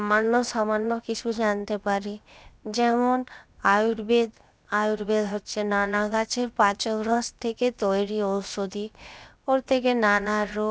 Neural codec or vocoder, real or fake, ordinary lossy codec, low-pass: codec, 16 kHz, 0.7 kbps, FocalCodec; fake; none; none